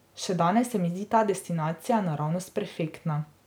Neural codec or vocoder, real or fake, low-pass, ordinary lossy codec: none; real; none; none